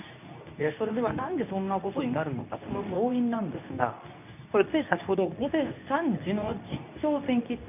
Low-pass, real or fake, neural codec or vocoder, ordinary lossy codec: 3.6 kHz; fake; codec, 24 kHz, 0.9 kbps, WavTokenizer, medium speech release version 2; none